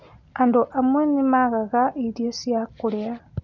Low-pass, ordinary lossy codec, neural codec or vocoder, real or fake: 7.2 kHz; none; none; real